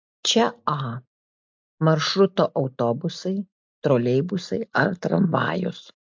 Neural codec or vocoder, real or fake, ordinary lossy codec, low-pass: none; real; MP3, 48 kbps; 7.2 kHz